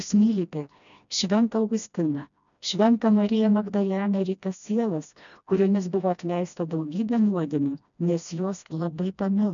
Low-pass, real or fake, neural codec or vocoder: 7.2 kHz; fake; codec, 16 kHz, 1 kbps, FreqCodec, smaller model